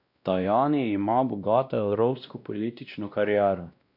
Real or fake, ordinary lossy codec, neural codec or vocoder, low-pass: fake; none; codec, 16 kHz, 1 kbps, X-Codec, WavLM features, trained on Multilingual LibriSpeech; 5.4 kHz